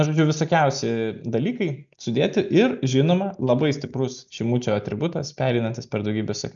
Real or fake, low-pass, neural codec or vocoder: real; 7.2 kHz; none